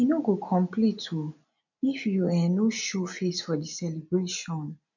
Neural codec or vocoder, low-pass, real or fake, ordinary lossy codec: none; 7.2 kHz; real; none